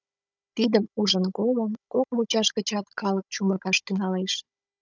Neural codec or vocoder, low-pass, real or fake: codec, 16 kHz, 16 kbps, FunCodec, trained on Chinese and English, 50 frames a second; 7.2 kHz; fake